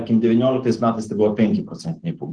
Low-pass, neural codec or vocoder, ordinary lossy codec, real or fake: 9.9 kHz; none; Opus, 16 kbps; real